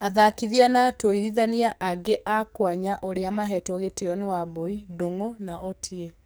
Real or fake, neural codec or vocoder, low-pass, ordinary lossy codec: fake; codec, 44.1 kHz, 2.6 kbps, SNAC; none; none